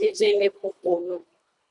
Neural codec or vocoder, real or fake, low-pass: codec, 24 kHz, 1.5 kbps, HILCodec; fake; 10.8 kHz